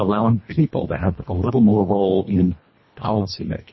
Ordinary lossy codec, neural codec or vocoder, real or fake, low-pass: MP3, 24 kbps; codec, 24 kHz, 1.5 kbps, HILCodec; fake; 7.2 kHz